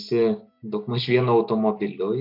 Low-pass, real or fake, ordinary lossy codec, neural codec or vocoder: 5.4 kHz; real; MP3, 48 kbps; none